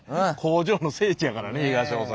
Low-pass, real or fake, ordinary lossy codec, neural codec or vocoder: none; real; none; none